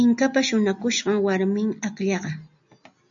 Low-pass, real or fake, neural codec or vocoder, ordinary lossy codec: 7.2 kHz; real; none; MP3, 64 kbps